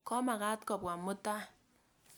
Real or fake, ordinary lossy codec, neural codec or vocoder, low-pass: real; none; none; none